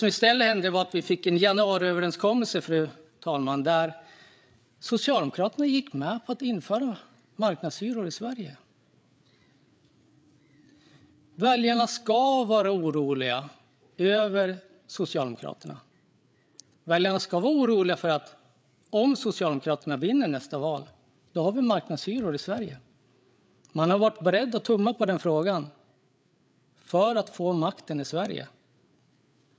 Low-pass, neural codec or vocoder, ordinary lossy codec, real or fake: none; codec, 16 kHz, 8 kbps, FreqCodec, larger model; none; fake